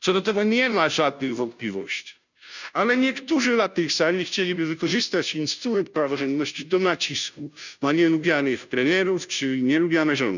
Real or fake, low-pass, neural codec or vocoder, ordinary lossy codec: fake; 7.2 kHz; codec, 16 kHz, 0.5 kbps, FunCodec, trained on Chinese and English, 25 frames a second; none